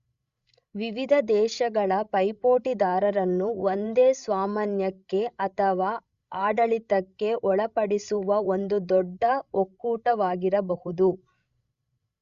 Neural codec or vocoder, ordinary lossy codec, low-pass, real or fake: codec, 16 kHz, 16 kbps, FreqCodec, larger model; Opus, 64 kbps; 7.2 kHz; fake